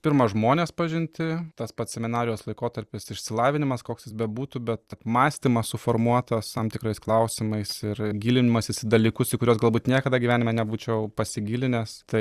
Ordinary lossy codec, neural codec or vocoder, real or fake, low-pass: Opus, 64 kbps; vocoder, 44.1 kHz, 128 mel bands every 256 samples, BigVGAN v2; fake; 14.4 kHz